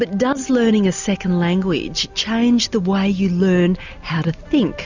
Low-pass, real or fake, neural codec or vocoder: 7.2 kHz; real; none